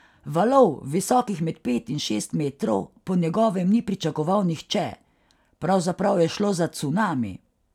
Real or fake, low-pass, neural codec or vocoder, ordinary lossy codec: real; 19.8 kHz; none; none